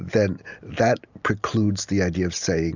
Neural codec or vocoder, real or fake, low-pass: none; real; 7.2 kHz